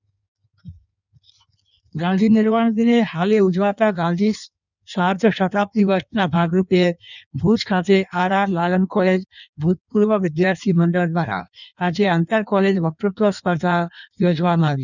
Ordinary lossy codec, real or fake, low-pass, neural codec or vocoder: none; fake; 7.2 kHz; codec, 16 kHz in and 24 kHz out, 1.1 kbps, FireRedTTS-2 codec